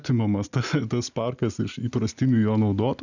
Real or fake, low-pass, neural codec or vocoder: real; 7.2 kHz; none